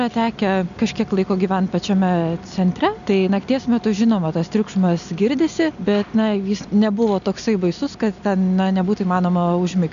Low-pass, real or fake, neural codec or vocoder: 7.2 kHz; real; none